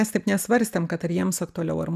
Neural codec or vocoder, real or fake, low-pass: none; real; 14.4 kHz